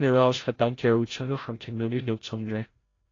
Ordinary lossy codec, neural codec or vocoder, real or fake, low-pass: AAC, 32 kbps; codec, 16 kHz, 0.5 kbps, FreqCodec, larger model; fake; 7.2 kHz